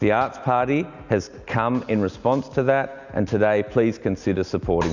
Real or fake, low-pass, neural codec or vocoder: real; 7.2 kHz; none